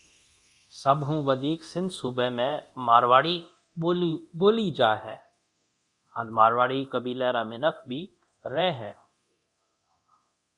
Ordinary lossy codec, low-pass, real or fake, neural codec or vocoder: Opus, 64 kbps; 10.8 kHz; fake; codec, 24 kHz, 0.9 kbps, DualCodec